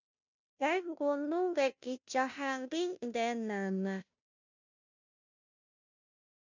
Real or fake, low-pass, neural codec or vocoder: fake; 7.2 kHz; codec, 16 kHz, 0.5 kbps, FunCodec, trained on Chinese and English, 25 frames a second